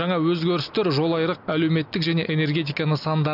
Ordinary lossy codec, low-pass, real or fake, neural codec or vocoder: none; 5.4 kHz; real; none